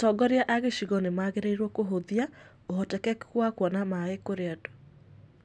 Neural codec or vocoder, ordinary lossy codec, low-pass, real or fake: none; none; none; real